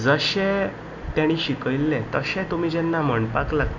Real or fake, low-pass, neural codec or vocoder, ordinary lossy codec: real; 7.2 kHz; none; none